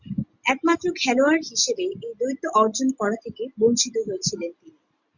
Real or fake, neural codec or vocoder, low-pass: real; none; 7.2 kHz